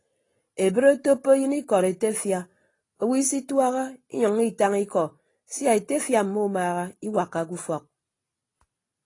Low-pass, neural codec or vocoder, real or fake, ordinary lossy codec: 10.8 kHz; none; real; AAC, 32 kbps